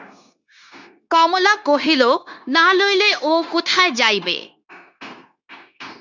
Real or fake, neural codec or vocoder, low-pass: fake; codec, 16 kHz, 0.9 kbps, LongCat-Audio-Codec; 7.2 kHz